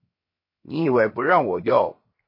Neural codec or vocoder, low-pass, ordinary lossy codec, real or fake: codec, 16 kHz, 0.7 kbps, FocalCodec; 5.4 kHz; MP3, 24 kbps; fake